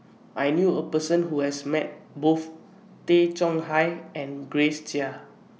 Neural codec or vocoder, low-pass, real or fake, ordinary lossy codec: none; none; real; none